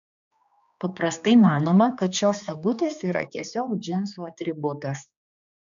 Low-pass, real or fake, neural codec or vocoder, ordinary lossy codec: 7.2 kHz; fake; codec, 16 kHz, 2 kbps, X-Codec, HuBERT features, trained on general audio; AAC, 96 kbps